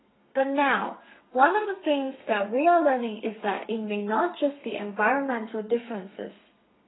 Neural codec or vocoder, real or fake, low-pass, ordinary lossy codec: codec, 32 kHz, 1.9 kbps, SNAC; fake; 7.2 kHz; AAC, 16 kbps